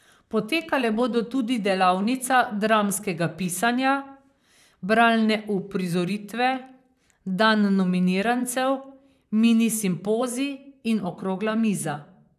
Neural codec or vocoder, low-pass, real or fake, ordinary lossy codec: vocoder, 44.1 kHz, 128 mel bands, Pupu-Vocoder; 14.4 kHz; fake; none